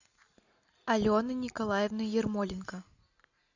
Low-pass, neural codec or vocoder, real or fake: 7.2 kHz; none; real